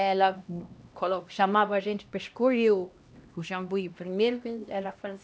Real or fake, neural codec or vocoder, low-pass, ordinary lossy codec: fake; codec, 16 kHz, 1 kbps, X-Codec, HuBERT features, trained on LibriSpeech; none; none